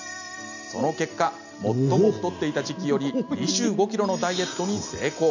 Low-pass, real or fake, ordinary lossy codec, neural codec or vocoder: 7.2 kHz; real; none; none